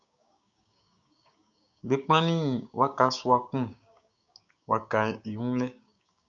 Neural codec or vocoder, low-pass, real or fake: codec, 16 kHz, 6 kbps, DAC; 7.2 kHz; fake